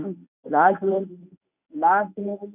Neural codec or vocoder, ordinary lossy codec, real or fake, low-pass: codec, 24 kHz, 0.9 kbps, WavTokenizer, medium speech release version 1; none; fake; 3.6 kHz